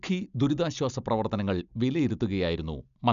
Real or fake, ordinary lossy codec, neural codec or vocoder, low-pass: real; none; none; 7.2 kHz